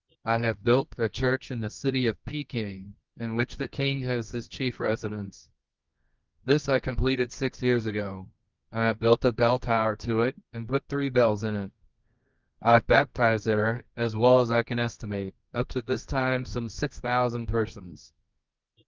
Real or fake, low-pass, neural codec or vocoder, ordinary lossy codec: fake; 7.2 kHz; codec, 24 kHz, 0.9 kbps, WavTokenizer, medium music audio release; Opus, 24 kbps